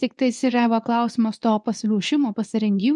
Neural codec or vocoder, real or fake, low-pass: codec, 24 kHz, 0.9 kbps, WavTokenizer, medium speech release version 2; fake; 10.8 kHz